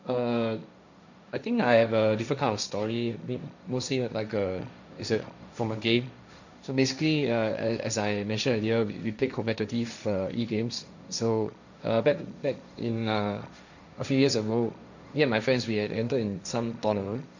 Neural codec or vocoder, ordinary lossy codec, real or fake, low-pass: codec, 16 kHz, 1.1 kbps, Voila-Tokenizer; none; fake; 7.2 kHz